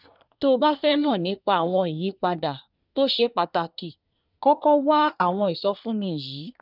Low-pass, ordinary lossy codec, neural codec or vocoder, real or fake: 5.4 kHz; none; codec, 24 kHz, 1 kbps, SNAC; fake